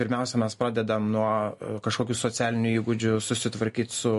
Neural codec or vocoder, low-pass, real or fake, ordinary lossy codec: none; 14.4 kHz; real; MP3, 48 kbps